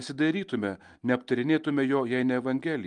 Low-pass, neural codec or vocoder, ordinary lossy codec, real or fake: 10.8 kHz; none; Opus, 32 kbps; real